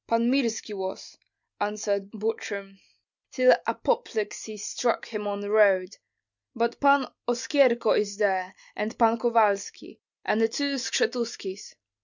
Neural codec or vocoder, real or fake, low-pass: none; real; 7.2 kHz